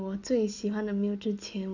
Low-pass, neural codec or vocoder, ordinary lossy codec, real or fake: 7.2 kHz; none; none; real